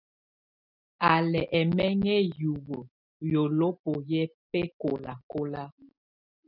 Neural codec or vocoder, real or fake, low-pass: none; real; 5.4 kHz